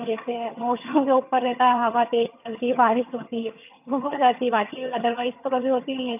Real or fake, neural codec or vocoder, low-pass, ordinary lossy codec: fake; vocoder, 22.05 kHz, 80 mel bands, HiFi-GAN; 3.6 kHz; none